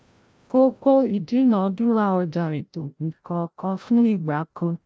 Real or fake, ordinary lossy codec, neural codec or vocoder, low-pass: fake; none; codec, 16 kHz, 0.5 kbps, FreqCodec, larger model; none